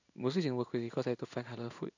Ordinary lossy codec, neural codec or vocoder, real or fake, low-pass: none; codec, 16 kHz in and 24 kHz out, 1 kbps, XY-Tokenizer; fake; 7.2 kHz